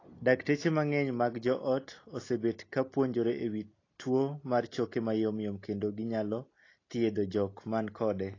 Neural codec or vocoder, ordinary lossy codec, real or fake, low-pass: none; AAC, 32 kbps; real; 7.2 kHz